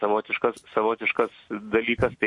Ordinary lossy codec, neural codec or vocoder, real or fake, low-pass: MP3, 48 kbps; none; real; 10.8 kHz